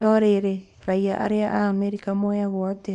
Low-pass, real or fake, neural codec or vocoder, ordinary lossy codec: 10.8 kHz; fake; codec, 24 kHz, 0.9 kbps, WavTokenizer, small release; none